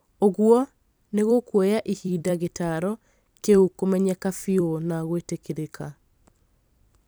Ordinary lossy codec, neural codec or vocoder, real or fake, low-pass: none; vocoder, 44.1 kHz, 128 mel bands every 256 samples, BigVGAN v2; fake; none